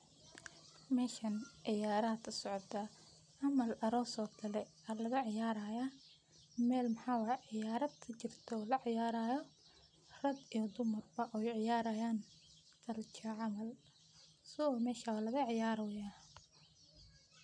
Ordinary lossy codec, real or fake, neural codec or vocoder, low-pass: none; real; none; 9.9 kHz